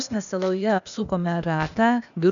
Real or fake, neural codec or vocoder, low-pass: fake; codec, 16 kHz, 0.8 kbps, ZipCodec; 7.2 kHz